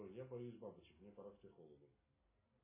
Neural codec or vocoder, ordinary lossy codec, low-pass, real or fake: none; MP3, 16 kbps; 3.6 kHz; real